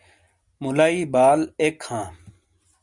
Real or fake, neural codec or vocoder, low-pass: real; none; 10.8 kHz